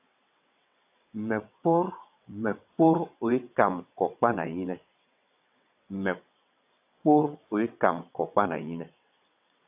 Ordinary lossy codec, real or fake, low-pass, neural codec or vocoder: AAC, 32 kbps; fake; 3.6 kHz; vocoder, 22.05 kHz, 80 mel bands, WaveNeXt